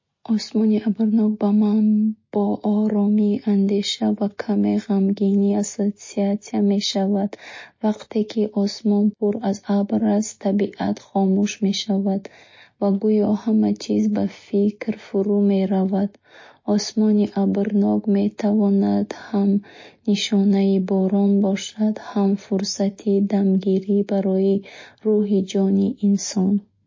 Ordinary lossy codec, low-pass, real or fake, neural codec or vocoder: MP3, 32 kbps; 7.2 kHz; real; none